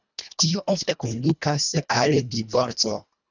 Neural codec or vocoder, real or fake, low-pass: codec, 24 kHz, 1.5 kbps, HILCodec; fake; 7.2 kHz